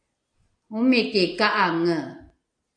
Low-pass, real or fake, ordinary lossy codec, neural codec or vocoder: 9.9 kHz; real; AAC, 64 kbps; none